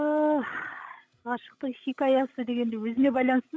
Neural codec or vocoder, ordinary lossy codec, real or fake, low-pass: codec, 16 kHz, 16 kbps, FreqCodec, larger model; none; fake; none